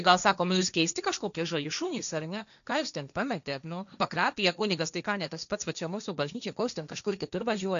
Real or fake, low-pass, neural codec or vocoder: fake; 7.2 kHz; codec, 16 kHz, 1.1 kbps, Voila-Tokenizer